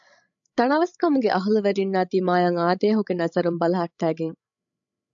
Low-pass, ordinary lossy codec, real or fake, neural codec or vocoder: 7.2 kHz; AAC, 64 kbps; fake; codec, 16 kHz, 16 kbps, FreqCodec, larger model